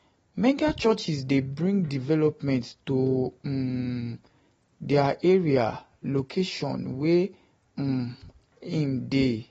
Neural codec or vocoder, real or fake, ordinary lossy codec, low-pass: none; real; AAC, 24 kbps; 19.8 kHz